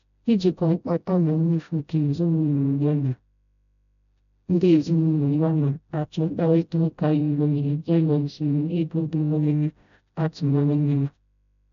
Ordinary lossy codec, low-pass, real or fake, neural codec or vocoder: none; 7.2 kHz; fake; codec, 16 kHz, 0.5 kbps, FreqCodec, smaller model